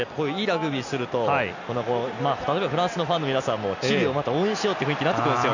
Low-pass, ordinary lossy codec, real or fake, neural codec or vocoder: 7.2 kHz; none; real; none